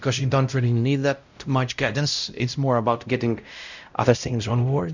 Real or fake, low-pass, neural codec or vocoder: fake; 7.2 kHz; codec, 16 kHz, 0.5 kbps, X-Codec, WavLM features, trained on Multilingual LibriSpeech